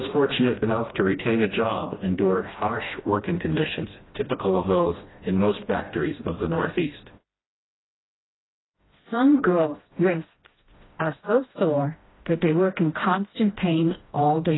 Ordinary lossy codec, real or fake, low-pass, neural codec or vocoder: AAC, 16 kbps; fake; 7.2 kHz; codec, 16 kHz, 1 kbps, FreqCodec, smaller model